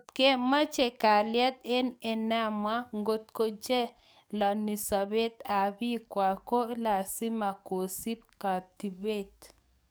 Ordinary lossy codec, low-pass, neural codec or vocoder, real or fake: none; none; codec, 44.1 kHz, 7.8 kbps, DAC; fake